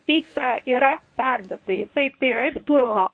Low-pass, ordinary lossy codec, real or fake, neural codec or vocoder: 9.9 kHz; MP3, 48 kbps; fake; codec, 24 kHz, 0.9 kbps, WavTokenizer, medium speech release version 1